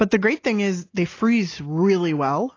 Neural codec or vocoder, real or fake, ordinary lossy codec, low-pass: none; real; AAC, 32 kbps; 7.2 kHz